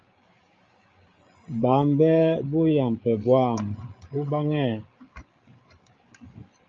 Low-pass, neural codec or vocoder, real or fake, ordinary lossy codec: 7.2 kHz; codec, 16 kHz, 8 kbps, FreqCodec, larger model; fake; Opus, 32 kbps